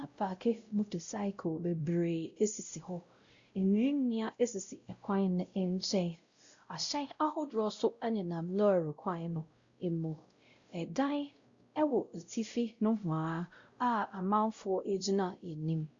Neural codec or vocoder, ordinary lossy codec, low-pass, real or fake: codec, 16 kHz, 0.5 kbps, X-Codec, WavLM features, trained on Multilingual LibriSpeech; Opus, 64 kbps; 7.2 kHz; fake